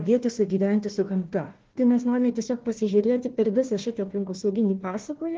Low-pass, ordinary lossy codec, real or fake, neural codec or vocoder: 7.2 kHz; Opus, 16 kbps; fake; codec, 16 kHz, 1 kbps, FunCodec, trained on Chinese and English, 50 frames a second